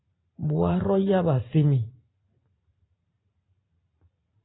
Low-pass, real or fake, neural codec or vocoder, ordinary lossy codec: 7.2 kHz; fake; vocoder, 44.1 kHz, 128 mel bands every 512 samples, BigVGAN v2; AAC, 16 kbps